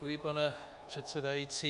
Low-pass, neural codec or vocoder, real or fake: 10.8 kHz; codec, 24 kHz, 1.2 kbps, DualCodec; fake